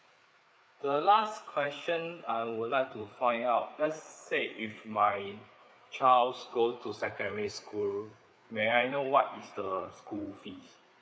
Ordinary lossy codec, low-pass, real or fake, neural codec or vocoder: none; none; fake; codec, 16 kHz, 4 kbps, FreqCodec, larger model